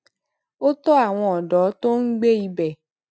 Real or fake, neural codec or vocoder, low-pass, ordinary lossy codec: real; none; none; none